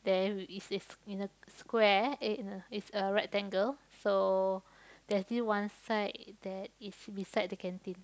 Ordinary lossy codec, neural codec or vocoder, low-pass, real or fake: none; none; none; real